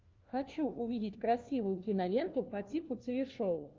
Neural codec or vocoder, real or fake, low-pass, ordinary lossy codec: codec, 16 kHz, 1 kbps, FunCodec, trained on LibriTTS, 50 frames a second; fake; 7.2 kHz; Opus, 32 kbps